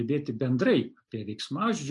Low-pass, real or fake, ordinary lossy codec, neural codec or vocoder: 10.8 kHz; real; AAC, 64 kbps; none